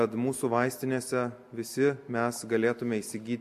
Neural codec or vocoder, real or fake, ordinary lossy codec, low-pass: none; real; MP3, 64 kbps; 14.4 kHz